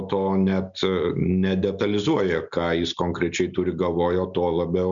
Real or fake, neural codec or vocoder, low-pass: real; none; 7.2 kHz